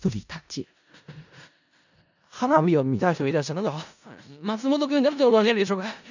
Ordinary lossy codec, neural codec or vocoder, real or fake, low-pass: none; codec, 16 kHz in and 24 kHz out, 0.4 kbps, LongCat-Audio-Codec, four codebook decoder; fake; 7.2 kHz